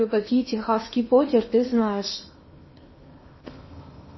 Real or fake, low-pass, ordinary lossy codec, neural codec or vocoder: fake; 7.2 kHz; MP3, 24 kbps; codec, 16 kHz in and 24 kHz out, 0.8 kbps, FocalCodec, streaming, 65536 codes